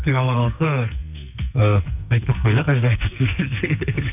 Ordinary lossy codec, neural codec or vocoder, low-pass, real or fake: none; codec, 44.1 kHz, 2.6 kbps, SNAC; 3.6 kHz; fake